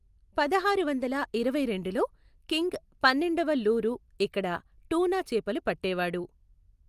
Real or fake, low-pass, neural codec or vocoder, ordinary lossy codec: real; 14.4 kHz; none; Opus, 32 kbps